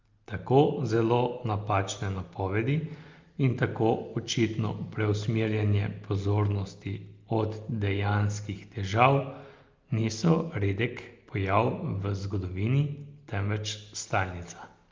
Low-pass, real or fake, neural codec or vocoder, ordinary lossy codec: 7.2 kHz; real; none; Opus, 24 kbps